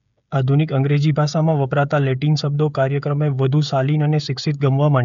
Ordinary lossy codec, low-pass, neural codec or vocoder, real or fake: none; 7.2 kHz; codec, 16 kHz, 16 kbps, FreqCodec, smaller model; fake